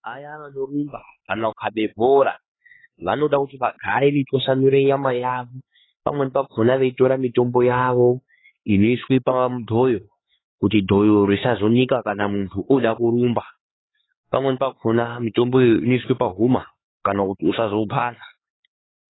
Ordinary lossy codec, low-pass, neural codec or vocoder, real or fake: AAC, 16 kbps; 7.2 kHz; codec, 16 kHz, 4 kbps, X-Codec, HuBERT features, trained on LibriSpeech; fake